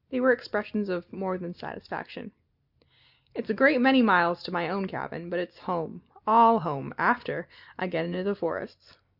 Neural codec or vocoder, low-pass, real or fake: none; 5.4 kHz; real